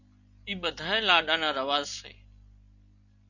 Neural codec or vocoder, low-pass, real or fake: none; 7.2 kHz; real